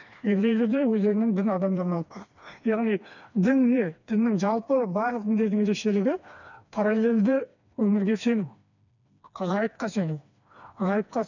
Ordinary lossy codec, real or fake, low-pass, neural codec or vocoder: none; fake; 7.2 kHz; codec, 16 kHz, 2 kbps, FreqCodec, smaller model